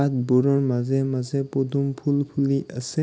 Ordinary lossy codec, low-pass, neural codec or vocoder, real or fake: none; none; none; real